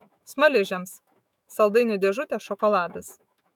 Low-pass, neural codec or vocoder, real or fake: 19.8 kHz; autoencoder, 48 kHz, 128 numbers a frame, DAC-VAE, trained on Japanese speech; fake